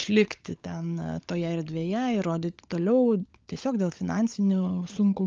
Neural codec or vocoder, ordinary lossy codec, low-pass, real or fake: codec, 16 kHz, 16 kbps, FunCodec, trained on LibriTTS, 50 frames a second; Opus, 32 kbps; 7.2 kHz; fake